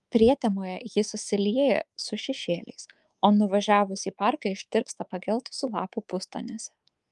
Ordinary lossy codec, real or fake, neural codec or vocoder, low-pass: Opus, 32 kbps; fake; codec, 24 kHz, 3.1 kbps, DualCodec; 10.8 kHz